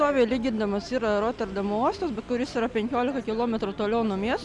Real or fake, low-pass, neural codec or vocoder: real; 10.8 kHz; none